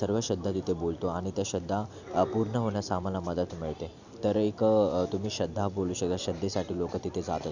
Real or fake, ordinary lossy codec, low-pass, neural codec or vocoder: real; none; 7.2 kHz; none